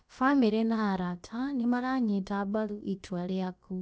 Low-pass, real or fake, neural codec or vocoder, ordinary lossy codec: none; fake; codec, 16 kHz, about 1 kbps, DyCAST, with the encoder's durations; none